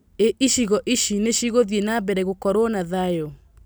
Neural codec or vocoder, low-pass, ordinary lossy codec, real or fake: none; none; none; real